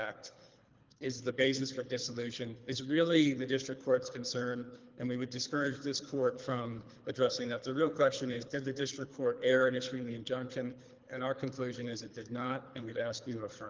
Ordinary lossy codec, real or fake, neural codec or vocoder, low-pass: Opus, 32 kbps; fake; codec, 24 kHz, 3 kbps, HILCodec; 7.2 kHz